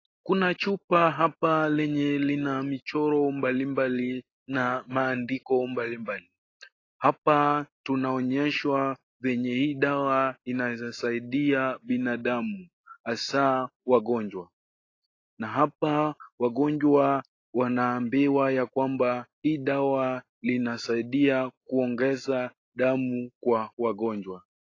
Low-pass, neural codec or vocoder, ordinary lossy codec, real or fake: 7.2 kHz; none; AAC, 32 kbps; real